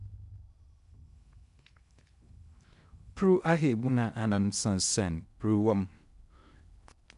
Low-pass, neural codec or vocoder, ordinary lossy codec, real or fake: 10.8 kHz; codec, 16 kHz in and 24 kHz out, 0.6 kbps, FocalCodec, streaming, 2048 codes; none; fake